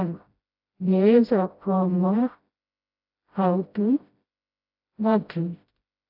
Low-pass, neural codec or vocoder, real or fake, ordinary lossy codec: 5.4 kHz; codec, 16 kHz, 0.5 kbps, FreqCodec, smaller model; fake; AAC, 48 kbps